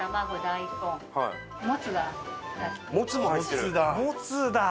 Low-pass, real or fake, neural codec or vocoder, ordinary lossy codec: none; real; none; none